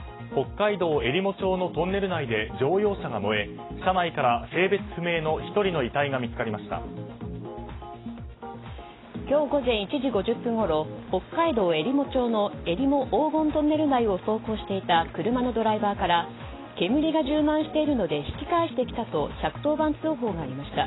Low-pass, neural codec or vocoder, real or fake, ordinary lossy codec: 7.2 kHz; none; real; AAC, 16 kbps